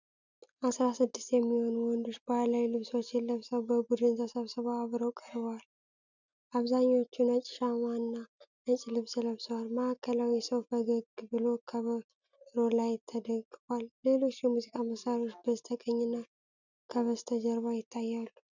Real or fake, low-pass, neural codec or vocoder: real; 7.2 kHz; none